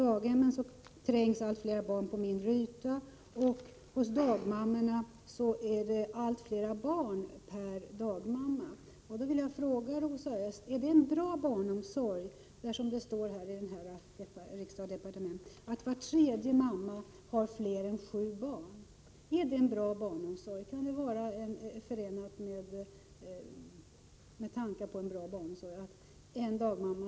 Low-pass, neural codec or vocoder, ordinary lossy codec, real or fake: none; none; none; real